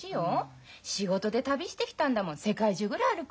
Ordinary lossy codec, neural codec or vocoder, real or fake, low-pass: none; none; real; none